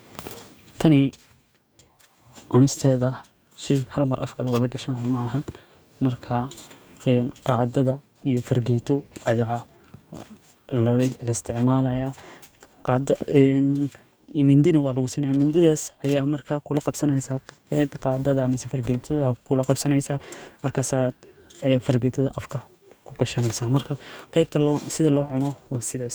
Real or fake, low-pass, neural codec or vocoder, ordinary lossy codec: fake; none; codec, 44.1 kHz, 2.6 kbps, DAC; none